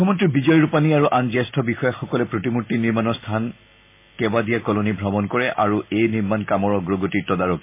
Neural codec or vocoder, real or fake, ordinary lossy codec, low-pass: none; real; MP3, 24 kbps; 3.6 kHz